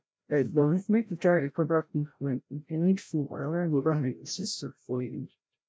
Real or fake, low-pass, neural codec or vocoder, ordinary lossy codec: fake; none; codec, 16 kHz, 0.5 kbps, FreqCodec, larger model; none